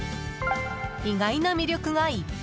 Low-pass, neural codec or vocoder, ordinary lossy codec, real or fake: none; none; none; real